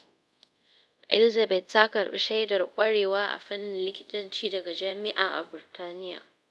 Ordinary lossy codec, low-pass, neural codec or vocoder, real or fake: none; none; codec, 24 kHz, 0.5 kbps, DualCodec; fake